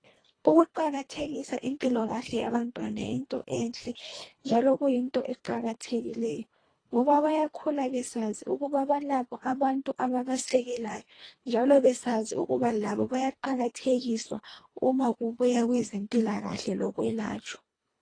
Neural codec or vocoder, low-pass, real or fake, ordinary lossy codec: codec, 24 kHz, 1.5 kbps, HILCodec; 9.9 kHz; fake; AAC, 32 kbps